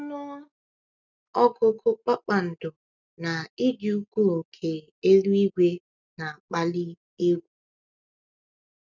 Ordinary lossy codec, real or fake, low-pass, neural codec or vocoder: none; real; 7.2 kHz; none